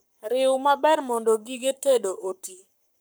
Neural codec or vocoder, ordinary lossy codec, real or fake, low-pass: codec, 44.1 kHz, 7.8 kbps, Pupu-Codec; none; fake; none